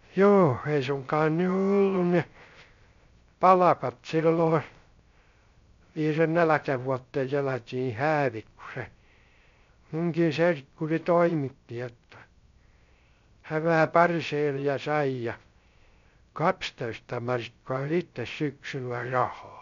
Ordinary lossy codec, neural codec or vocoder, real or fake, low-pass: MP3, 64 kbps; codec, 16 kHz, 0.3 kbps, FocalCodec; fake; 7.2 kHz